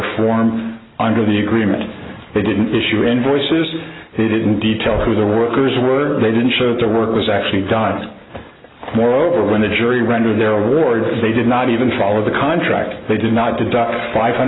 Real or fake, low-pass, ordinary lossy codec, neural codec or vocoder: real; 7.2 kHz; AAC, 16 kbps; none